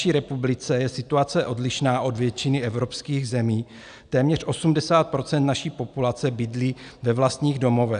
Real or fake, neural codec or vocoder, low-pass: real; none; 9.9 kHz